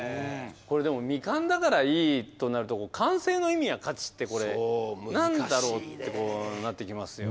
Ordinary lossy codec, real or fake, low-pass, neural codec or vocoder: none; real; none; none